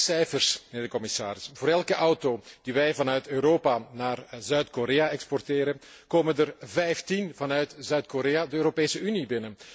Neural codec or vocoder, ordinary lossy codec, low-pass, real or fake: none; none; none; real